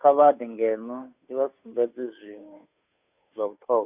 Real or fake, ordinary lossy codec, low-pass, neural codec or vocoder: fake; none; 3.6 kHz; codec, 16 kHz, 2 kbps, FunCodec, trained on Chinese and English, 25 frames a second